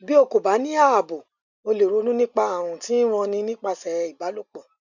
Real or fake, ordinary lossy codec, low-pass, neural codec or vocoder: real; none; 7.2 kHz; none